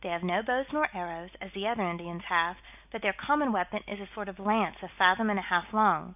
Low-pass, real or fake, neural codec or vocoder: 3.6 kHz; real; none